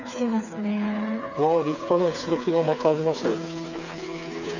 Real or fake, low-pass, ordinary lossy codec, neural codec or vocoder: fake; 7.2 kHz; none; codec, 16 kHz, 4 kbps, FreqCodec, smaller model